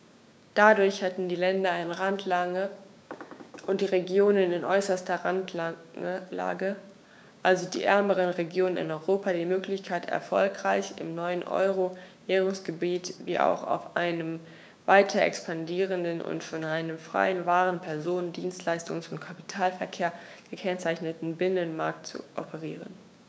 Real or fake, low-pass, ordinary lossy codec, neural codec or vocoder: fake; none; none; codec, 16 kHz, 6 kbps, DAC